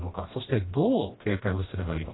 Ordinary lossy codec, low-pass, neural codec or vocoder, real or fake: AAC, 16 kbps; 7.2 kHz; codec, 16 kHz, 1 kbps, FreqCodec, smaller model; fake